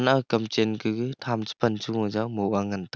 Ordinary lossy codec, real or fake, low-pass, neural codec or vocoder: none; real; none; none